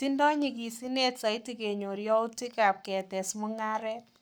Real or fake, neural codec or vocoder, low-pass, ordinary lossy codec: fake; codec, 44.1 kHz, 7.8 kbps, Pupu-Codec; none; none